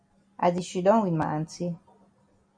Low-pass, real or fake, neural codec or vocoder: 9.9 kHz; real; none